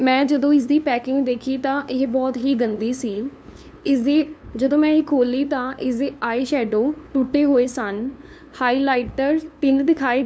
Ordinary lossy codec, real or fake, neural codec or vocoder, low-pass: none; fake; codec, 16 kHz, 2 kbps, FunCodec, trained on LibriTTS, 25 frames a second; none